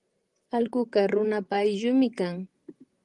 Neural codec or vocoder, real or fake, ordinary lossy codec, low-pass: vocoder, 44.1 kHz, 128 mel bands, Pupu-Vocoder; fake; Opus, 24 kbps; 10.8 kHz